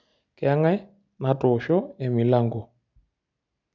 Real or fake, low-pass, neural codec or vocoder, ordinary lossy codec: real; 7.2 kHz; none; none